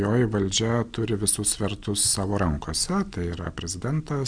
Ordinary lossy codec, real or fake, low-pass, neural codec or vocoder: MP3, 96 kbps; real; 9.9 kHz; none